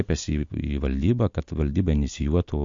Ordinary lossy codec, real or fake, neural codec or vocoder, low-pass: MP3, 48 kbps; real; none; 7.2 kHz